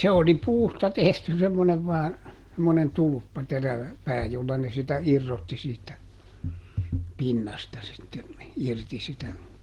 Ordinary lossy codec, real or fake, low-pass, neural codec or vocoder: Opus, 16 kbps; real; 19.8 kHz; none